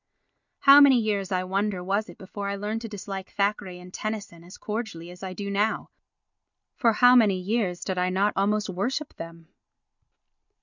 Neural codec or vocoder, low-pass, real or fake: none; 7.2 kHz; real